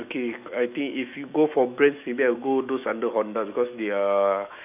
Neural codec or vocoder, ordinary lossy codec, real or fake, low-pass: autoencoder, 48 kHz, 128 numbers a frame, DAC-VAE, trained on Japanese speech; none; fake; 3.6 kHz